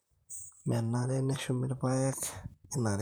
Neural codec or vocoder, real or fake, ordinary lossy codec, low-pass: vocoder, 44.1 kHz, 128 mel bands, Pupu-Vocoder; fake; none; none